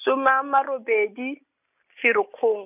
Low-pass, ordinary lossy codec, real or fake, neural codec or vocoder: 3.6 kHz; none; real; none